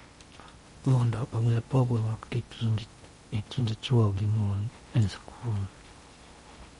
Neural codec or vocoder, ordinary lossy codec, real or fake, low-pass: codec, 16 kHz in and 24 kHz out, 0.8 kbps, FocalCodec, streaming, 65536 codes; MP3, 48 kbps; fake; 10.8 kHz